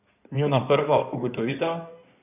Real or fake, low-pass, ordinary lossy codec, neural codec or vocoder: fake; 3.6 kHz; none; codec, 16 kHz in and 24 kHz out, 2.2 kbps, FireRedTTS-2 codec